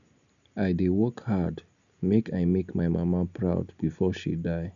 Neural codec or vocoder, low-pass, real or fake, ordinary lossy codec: none; 7.2 kHz; real; none